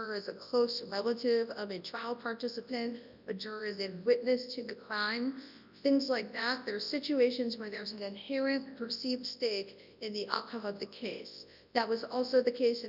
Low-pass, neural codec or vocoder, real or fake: 5.4 kHz; codec, 24 kHz, 0.9 kbps, WavTokenizer, large speech release; fake